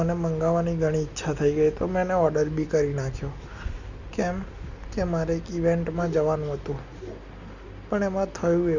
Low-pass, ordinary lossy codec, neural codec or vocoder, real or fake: 7.2 kHz; none; none; real